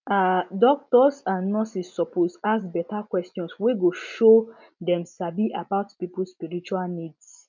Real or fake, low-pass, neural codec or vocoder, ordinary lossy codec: real; 7.2 kHz; none; none